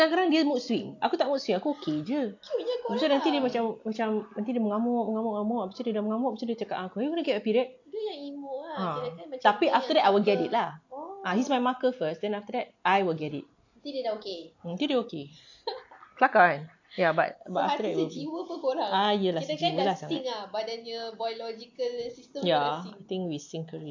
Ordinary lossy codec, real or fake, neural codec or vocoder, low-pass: AAC, 48 kbps; real; none; 7.2 kHz